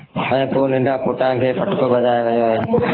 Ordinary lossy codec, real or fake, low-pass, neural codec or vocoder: AAC, 24 kbps; fake; 5.4 kHz; codec, 24 kHz, 6 kbps, HILCodec